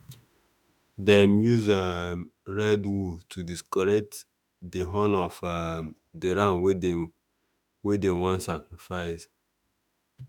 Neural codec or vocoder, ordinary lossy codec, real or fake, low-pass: autoencoder, 48 kHz, 32 numbers a frame, DAC-VAE, trained on Japanese speech; none; fake; 19.8 kHz